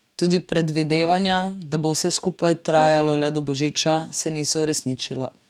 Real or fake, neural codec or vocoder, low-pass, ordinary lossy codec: fake; codec, 44.1 kHz, 2.6 kbps, DAC; 19.8 kHz; none